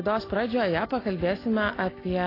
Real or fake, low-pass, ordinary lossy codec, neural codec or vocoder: real; 5.4 kHz; AAC, 24 kbps; none